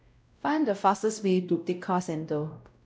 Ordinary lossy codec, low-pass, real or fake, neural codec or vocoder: none; none; fake; codec, 16 kHz, 0.5 kbps, X-Codec, WavLM features, trained on Multilingual LibriSpeech